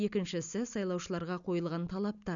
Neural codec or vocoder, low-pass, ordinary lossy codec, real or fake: none; 7.2 kHz; none; real